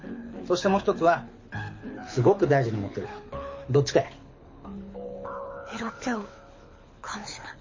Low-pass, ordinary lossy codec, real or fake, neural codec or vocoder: 7.2 kHz; MP3, 32 kbps; fake; codec, 24 kHz, 6 kbps, HILCodec